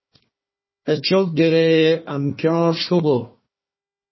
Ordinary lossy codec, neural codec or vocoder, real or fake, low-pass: MP3, 24 kbps; codec, 16 kHz, 1 kbps, FunCodec, trained on Chinese and English, 50 frames a second; fake; 7.2 kHz